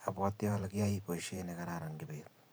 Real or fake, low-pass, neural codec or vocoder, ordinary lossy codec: fake; none; vocoder, 44.1 kHz, 128 mel bands every 512 samples, BigVGAN v2; none